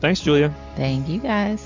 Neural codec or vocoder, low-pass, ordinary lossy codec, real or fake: none; 7.2 kHz; MP3, 64 kbps; real